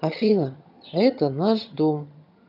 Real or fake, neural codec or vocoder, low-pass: fake; vocoder, 22.05 kHz, 80 mel bands, HiFi-GAN; 5.4 kHz